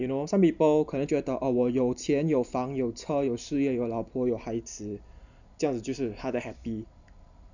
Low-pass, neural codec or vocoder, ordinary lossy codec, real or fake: 7.2 kHz; none; none; real